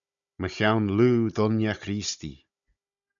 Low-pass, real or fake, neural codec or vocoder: 7.2 kHz; fake; codec, 16 kHz, 16 kbps, FunCodec, trained on Chinese and English, 50 frames a second